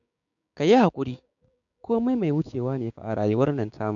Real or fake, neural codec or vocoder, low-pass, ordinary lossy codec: fake; codec, 16 kHz, 6 kbps, DAC; 7.2 kHz; none